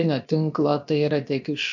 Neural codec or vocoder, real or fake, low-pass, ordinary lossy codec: codec, 16 kHz, about 1 kbps, DyCAST, with the encoder's durations; fake; 7.2 kHz; MP3, 64 kbps